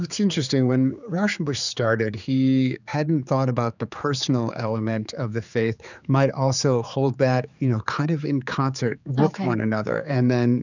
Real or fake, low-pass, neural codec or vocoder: fake; 7.2 kHz; codec, 16 kHz, 4 kbps, X-Codec, HuBERT features, trained on general audio